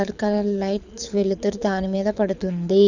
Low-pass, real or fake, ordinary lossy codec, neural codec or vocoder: 7.2 kHz; fake; none; codec, 24 kHz, 6 kbps, HILCodec